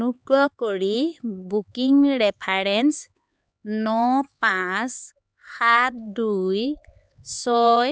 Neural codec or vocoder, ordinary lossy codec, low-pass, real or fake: codec, 16 kHz, 4 kbps, X-Codec, HuBERT features, trained on LibriSpeech; none; none; fake